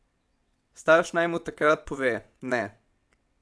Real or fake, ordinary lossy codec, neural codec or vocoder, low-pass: fake; none; vocoder, 22.05 kHz, 80 mel bands, WaveNeXt; none